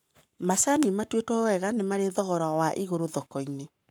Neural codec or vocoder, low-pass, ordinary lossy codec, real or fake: codec, 44.1 kHz, 7.8 kbps, Pupu-Codec; none; none; fake